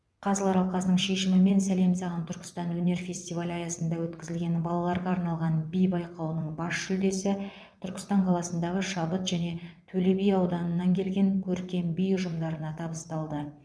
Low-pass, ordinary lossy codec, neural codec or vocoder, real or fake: none; none; vocoder, 22.05 kHz, 80 mel bands, WaveNeXt; fake